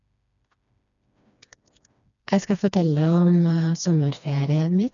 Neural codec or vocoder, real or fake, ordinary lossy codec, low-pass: codec, 16 kHz, 2 kbps, FreqCodec, smaller model; fake; none; 7.2 kHz